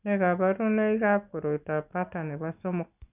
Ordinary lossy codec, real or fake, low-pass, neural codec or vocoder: none; real; 3.6 kHz; none